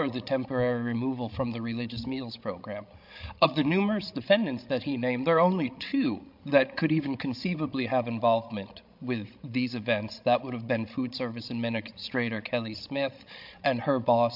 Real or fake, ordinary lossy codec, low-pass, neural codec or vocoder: fake; MP3, 48 kbps; 5.4 kHz; codec, 16 kHz, 16 kbps, FreqCodec, larger model